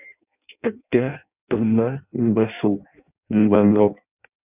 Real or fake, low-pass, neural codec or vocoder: fake; 3.6 kHz; codec, 16 kHz in and 24 kHz out, 0.6 kbps, FireRedTTS-2 codec